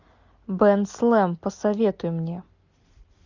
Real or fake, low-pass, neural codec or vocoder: real; 7.2 kHz; none